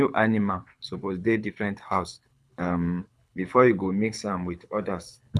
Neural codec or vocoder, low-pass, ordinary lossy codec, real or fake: codec, 24 kHz, 6 kbps, HILCodec; none; none; fake